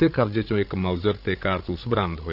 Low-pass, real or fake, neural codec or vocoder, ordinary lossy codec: 5.4 kHz; fake; codec, 16 kHz, 16 kbps, FreqCodec, larger model; none